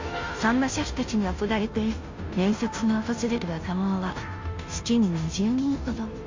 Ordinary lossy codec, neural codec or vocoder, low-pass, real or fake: MP3, 48 kbps; codec, 16 kHz, 0.5 kbps, FunCodec, trained on Chinese and English, 25 frames a second; 7.2 kHz; fake